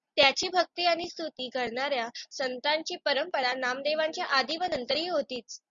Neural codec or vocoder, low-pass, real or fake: none; 7.2 kHz; real